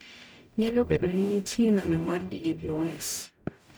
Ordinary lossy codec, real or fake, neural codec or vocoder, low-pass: none; fake; codec, 44.1 kHz, 0.9 kbps, DAC; none